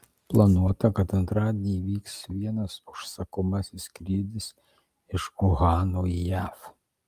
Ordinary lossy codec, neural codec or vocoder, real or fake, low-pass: Opus, 32 kbps; none; real; 14.4 kHz